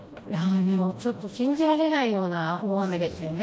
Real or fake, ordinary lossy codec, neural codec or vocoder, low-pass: fake; none; codec, 16 kHz, 1 kbps, FreqCodec, smaller model; none